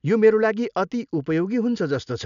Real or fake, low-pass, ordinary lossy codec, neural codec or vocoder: real; 7.2 kHz; none; none